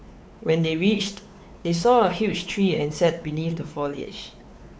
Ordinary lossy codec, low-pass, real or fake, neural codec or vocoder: none; none; fake; codec, 16 kHz, 4 kbps, X-Codec, WavLM features, trained on Multilingual LibriSpeech